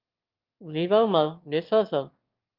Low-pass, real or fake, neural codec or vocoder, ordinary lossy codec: 5.4 kHz; fake; autoencoder, 22.05 kHz, a latent of 192 numbers a frame, VITS, trained on one speaker; Opus, 32 kbps